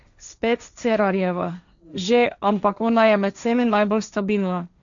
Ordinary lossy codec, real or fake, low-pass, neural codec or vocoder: none; fake; 7.2 kHz; codec, 16 kHz, 1.1 kbps, Voila-Tokenizer